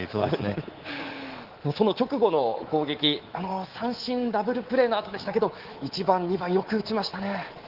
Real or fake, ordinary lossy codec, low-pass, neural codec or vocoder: fake; Opus, 16 kbps; 5.4 kHz; codec, 24 kHz, 3.1 kbps, DualCodec